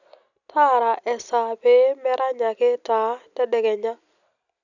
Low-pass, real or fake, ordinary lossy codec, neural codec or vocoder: 7.2 kHz; real; none; none